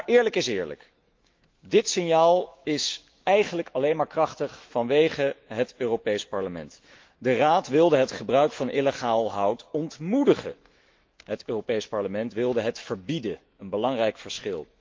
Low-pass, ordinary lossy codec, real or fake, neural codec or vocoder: 7.2 kHz; Opus, 32 kbps; fake; autoencoder, 48 kHz, 128 numbers a frame, DAC-VAE, trained on Japanese speech